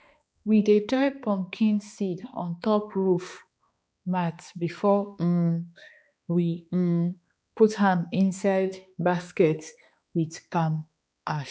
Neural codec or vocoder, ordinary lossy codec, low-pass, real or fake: codec, 16 kHz, 2 kbps, X-Codec, HuBERT features, trained on balanced general audio; none; none; fake